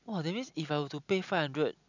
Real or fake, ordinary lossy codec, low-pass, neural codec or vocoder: real; none; 7.2 kHz; none